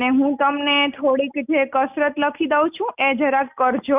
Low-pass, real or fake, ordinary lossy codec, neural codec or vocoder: 3.6 kHz; real; none; none